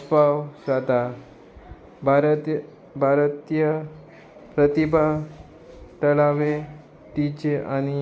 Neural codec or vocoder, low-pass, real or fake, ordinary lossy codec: none; none; real; none